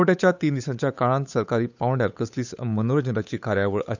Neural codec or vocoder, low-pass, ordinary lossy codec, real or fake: codec, 16 kHz, 8 kbps, FunCodec, trained on Chinese and English, 25 frames a second; 7.2 kHz; none; fake